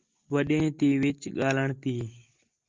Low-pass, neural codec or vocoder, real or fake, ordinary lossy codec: 7.2 kHz; none; real; Opus, 24 kbps